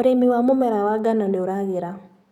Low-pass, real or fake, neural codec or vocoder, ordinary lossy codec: 19.8 kHz; fake; codec, 44.1 kHz, 7.8 kbps, DAC; none